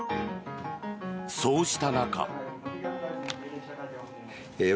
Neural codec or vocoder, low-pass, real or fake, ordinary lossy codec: none; none; real; none